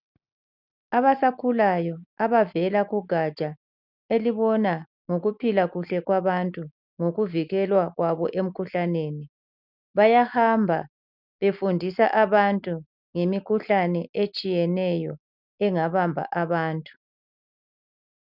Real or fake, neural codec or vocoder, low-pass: real; none; 5.4 kHz